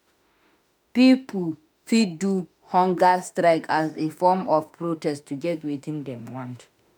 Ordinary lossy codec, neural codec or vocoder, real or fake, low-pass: none; autoencoder, 48 kHz, 32 numbers a frame, DAC-VAE, trained on Japanese speech; fake; none